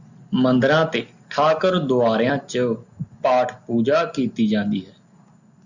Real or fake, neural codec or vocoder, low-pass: real; none; 7.2 kHz